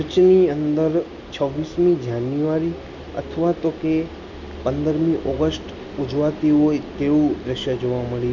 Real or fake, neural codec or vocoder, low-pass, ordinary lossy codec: real; none; 7.2 kHz; none